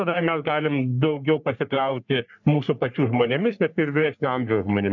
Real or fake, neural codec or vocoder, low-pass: fake; codec, 44.1 kHz, 3.4 kbps, Pupu-Codec; 7.2 kHz